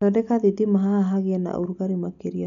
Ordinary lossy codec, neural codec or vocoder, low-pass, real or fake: none; none; 7.2 kHz; real